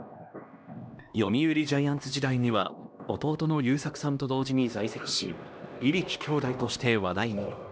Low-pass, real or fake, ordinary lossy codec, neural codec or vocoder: none; fake; none; codec, 16 kHz, 1 kbps, X-Codec, HuBERT features, trained on LibriSpeech